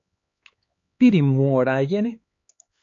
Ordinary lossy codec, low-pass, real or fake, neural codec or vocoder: AAC, 48 kbps; 7.2 kHz; fake; codec, 16 kHz, 4 kbps, X-Codec, HuBERT features, trained on LibriSpeech